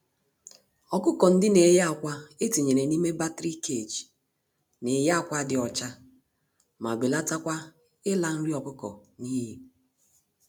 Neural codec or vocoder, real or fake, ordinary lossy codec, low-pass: none; real; none; none